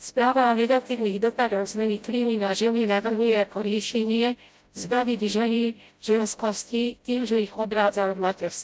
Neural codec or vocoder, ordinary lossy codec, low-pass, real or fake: codec, 16 kHz, 0.5 kbps, FreqCodec, smaller model; none; none; fake